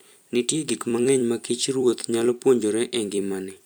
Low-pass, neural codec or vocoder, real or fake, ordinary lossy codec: none; vocoder, 44.1 kHz, 128 mel bands every 256 samples, BigVGAN v2; fake; none